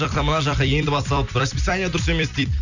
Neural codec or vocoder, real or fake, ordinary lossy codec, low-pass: vocoder, 44.1 kHz, 128 mel bands every 256 samples, BigVGAN v2; fake; none; 7.2 kHz